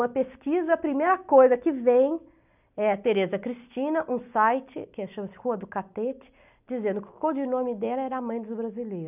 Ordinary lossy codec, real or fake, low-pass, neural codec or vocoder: none; real; 3.6 kHz; none